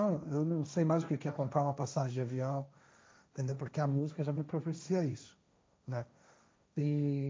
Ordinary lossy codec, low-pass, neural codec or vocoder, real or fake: none; none; codec, 16 kHz, 1.1 kbps, Voila-Tokenizer; fake